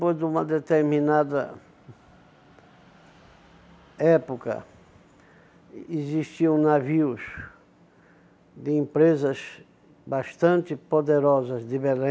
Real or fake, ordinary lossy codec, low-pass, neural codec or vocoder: real; none; none; none